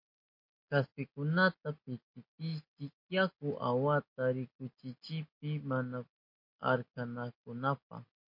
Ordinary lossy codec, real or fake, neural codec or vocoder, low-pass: MP3, 32 kbps; real; none; 5.4 kHz